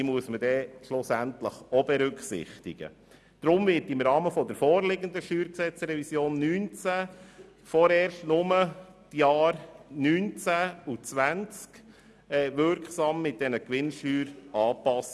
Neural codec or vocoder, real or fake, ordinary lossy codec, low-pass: none; real; none; none